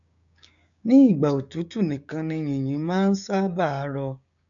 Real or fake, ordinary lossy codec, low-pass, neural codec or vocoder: fake; MP3, 64 kbps; 7.2 kHz; codec, 16 kHz, 6 kbps, DAC